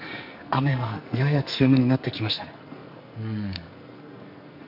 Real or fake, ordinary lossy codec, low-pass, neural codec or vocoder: fake; none; 5.4 kHz; codec, 44.1 kHz, 2.6 kbps, SNAC